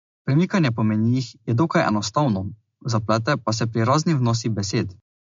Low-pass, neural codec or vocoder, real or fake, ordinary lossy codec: 7.2 kHz; none; real; MP3, 48 kbps